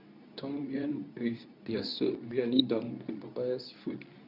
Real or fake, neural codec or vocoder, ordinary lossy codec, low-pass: fake; codec, 24 kHz, 0.9 kbps, WavTokenizer, medium speech release version 2; none; 5.4 kHz